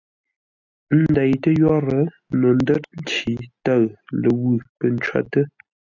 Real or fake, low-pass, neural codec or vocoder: real; 7.2 kHz; none